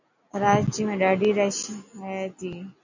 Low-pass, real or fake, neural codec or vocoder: 7.2 kHz; real; none